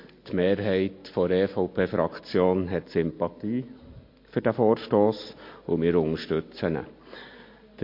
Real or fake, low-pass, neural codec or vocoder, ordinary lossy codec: real; 5.4 kHz; none; MP3, 32 kbps